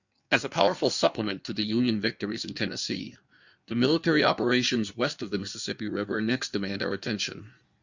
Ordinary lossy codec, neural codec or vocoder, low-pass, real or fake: Opus, 64 kbps; codec, 16 kHz in and 24 kHz out, 1.1 kbps, FireRedTTS-2 codec; 7.2 kHz; fake